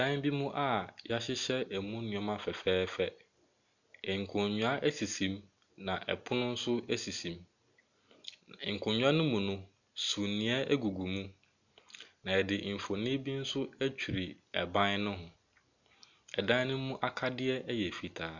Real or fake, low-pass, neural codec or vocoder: real; 7.2 kHz; none